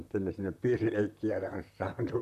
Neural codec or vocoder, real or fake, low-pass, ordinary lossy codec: vocoder, 44.1 kHz, 128 mel bands, Pupu-Vocoder; fake; 14.4 kHz; none